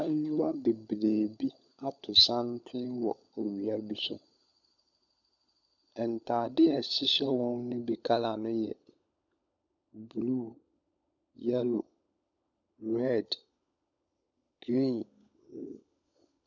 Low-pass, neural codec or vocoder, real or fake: 7.2 kHz; codec, 16 kHz, 16 kbps, FunCodec, trained on LibriTTS, 50 frames a second; fake